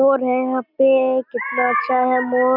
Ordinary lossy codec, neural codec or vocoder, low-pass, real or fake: none; none; 5.4 kHz; real